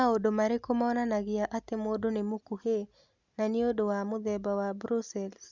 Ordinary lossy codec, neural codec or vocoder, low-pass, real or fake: Opus, 64 kbps; none; 7.2 kHz; real